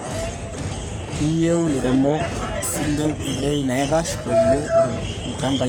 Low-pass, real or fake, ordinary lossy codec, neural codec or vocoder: none; fake; none; codec, 44.1 kHz, 3.4 kbps, Pupu-Codec